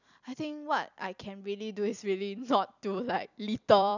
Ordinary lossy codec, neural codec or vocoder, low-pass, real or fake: none; vocoder, 44.1 kHz, 128 mel bands every 256 samples, BigVGAN v2; 7.2 kHz; fake